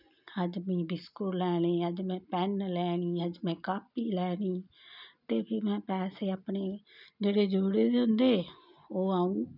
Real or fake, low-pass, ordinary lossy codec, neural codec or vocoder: real; 5.4 kHz; none; none